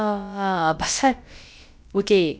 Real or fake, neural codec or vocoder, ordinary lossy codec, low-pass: fake; codec, 16 kHz, about 1 kbps, DyCAST, with the encoder's durations; none; none